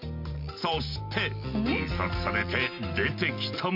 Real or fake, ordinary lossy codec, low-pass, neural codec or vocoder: real; none; 5.4 kHz; none